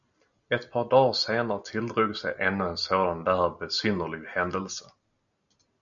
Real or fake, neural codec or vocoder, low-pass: real; none; 7.2 kHz